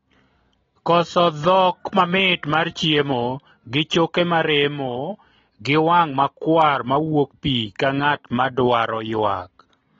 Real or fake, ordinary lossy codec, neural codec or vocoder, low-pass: real; AAC, 24 kbps; none; 7.2 kHz